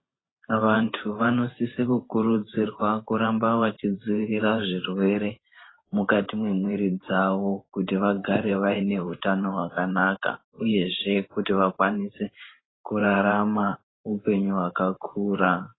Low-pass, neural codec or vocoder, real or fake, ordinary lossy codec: 7.2 kHz; vocoder, 44.1 kHz, 128 mel bands every 512 samples, BigVGAN v2; fake; AAC, 16 kbps